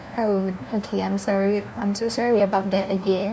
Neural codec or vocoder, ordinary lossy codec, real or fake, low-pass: codec, 16 kHz, 1 kbps, FunCodec, trained on LibriTTS, 50 frames a second; none; fake; none